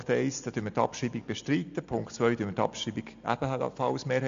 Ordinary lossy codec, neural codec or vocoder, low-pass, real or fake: AAC, 64 kbps; none; 7.2 kHz; real